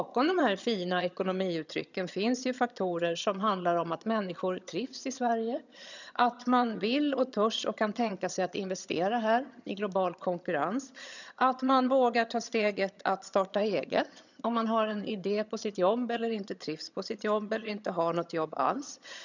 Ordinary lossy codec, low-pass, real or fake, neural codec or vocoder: none; 7.2 kHz; fake; vocoder, 22.05 kHz, 80 mel bands, HiFi-GAN